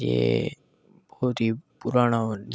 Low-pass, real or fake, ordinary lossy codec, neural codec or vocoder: none; real; none; none